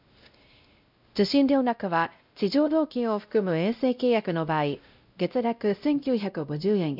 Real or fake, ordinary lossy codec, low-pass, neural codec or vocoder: fake; none; 5.4 kHz; codec, 16 kHz, 0.5 kbps, X-Codec, WavLM features, trained on Multilingual LibriSpeech